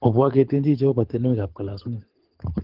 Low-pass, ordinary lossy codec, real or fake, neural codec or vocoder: 5.4 kHz; Opus, 16 kbps; fake; codec, 24 kHz, 6 kbps, HILCodec